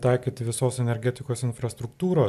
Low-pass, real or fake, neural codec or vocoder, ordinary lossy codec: 14.4 kHz; real; none; MP3, 96 kbps